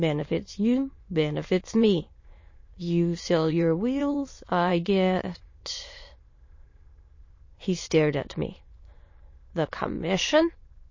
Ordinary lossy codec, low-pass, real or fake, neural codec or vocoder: MP3, 32 kbps; 7.2 kHz; fake; autoencoder, 22.05 kHz, a latent of 192 numbers a frame, VITS, trained on many speakers